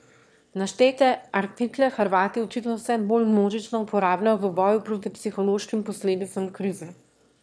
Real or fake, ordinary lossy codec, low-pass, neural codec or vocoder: fake; none; none; autoencoder, 22.05 kHz, a latent of 192 numbers a frame, VITS, trained on one speaker